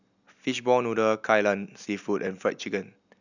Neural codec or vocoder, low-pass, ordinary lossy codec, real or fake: none; 7.2 kHz; none; real